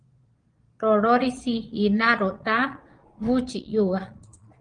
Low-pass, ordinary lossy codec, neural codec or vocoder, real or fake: 9.9 kHz; Opus, 24 kbps; vocoder, 22.05 kHz, 80 mel bands, Vocos; fake